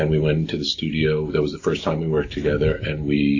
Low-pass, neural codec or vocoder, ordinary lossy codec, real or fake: 7.2 kHz; none; MP3, 48 kbps; real